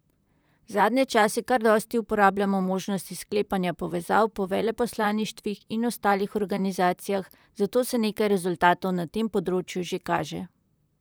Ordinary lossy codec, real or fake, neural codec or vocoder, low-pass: none; fake; vocoder, 44.1 kHz, 128 mel bands, Pupu-Vocoder; none